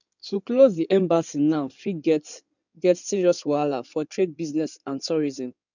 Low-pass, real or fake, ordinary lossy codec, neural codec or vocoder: 7.2 kHz; fake; none; codec, 16 kHz in and 24 kHz out, 2.2 kbps, FireRedTTS-2 codec